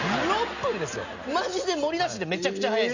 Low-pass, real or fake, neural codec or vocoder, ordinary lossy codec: 7.2 kHz; real; none; none